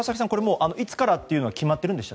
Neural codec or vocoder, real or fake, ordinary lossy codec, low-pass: none; real; none; none